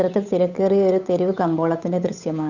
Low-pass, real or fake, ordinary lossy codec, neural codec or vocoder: 7.2 kHz; fake; none; codec, 16 kHz, 8 kbps, FunCodec, trained on Chinese and English, 25 frames a second